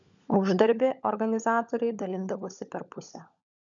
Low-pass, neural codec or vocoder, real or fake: 7.2 kHz; codec, 16 kHz, 16 kbps, FunCodec, trained on LibriTTS, 50 frames a second; fake